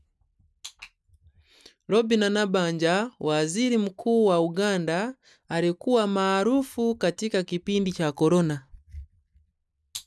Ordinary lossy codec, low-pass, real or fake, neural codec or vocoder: none; none; real; none